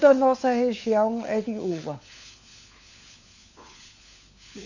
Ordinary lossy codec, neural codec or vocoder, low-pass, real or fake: none; codec, 16 kHz, 4 kbps, FunCodec, trained on LibriTTS, 50 frames a second; 7.2 kHz; fake